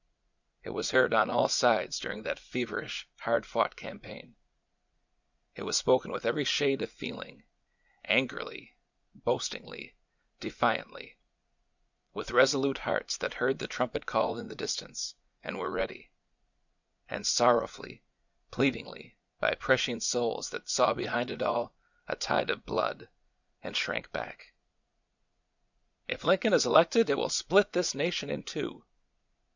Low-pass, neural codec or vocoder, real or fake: 7.2 kHz; vocoder, 44.1 kHz, 80 mel bands, Vocos; fake